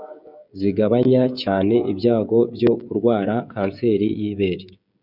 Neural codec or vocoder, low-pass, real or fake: codec, 16 kHz, 16 kbps, FreqCodec, smaller model; 5.4 kHz; fake